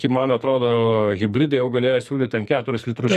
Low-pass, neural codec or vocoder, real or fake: 14.4 kHz; codec, 44.1 kHz, 2.6 kbps, SNAC; fake